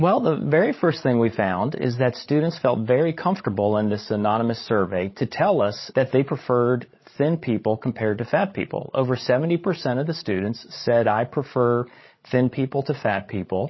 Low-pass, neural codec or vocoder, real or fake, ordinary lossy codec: 7.2 kHz; codec, 16 kHz, 16 kbps, FreqCodec, larger model; fake; MP3, 24 kbps